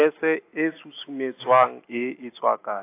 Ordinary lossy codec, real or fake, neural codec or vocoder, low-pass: AAC, 24 kbps; real; none; 3.6 kHz